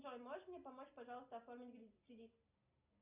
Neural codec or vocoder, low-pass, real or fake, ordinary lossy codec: none; 3.6 kHz; real; Opus, 64 kbps